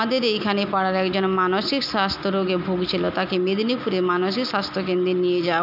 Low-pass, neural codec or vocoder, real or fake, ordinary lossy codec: 5.4 kHz; none; real; none